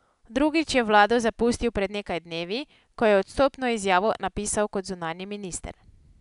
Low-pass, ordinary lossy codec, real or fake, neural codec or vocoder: 10.8 kHz; none; real; none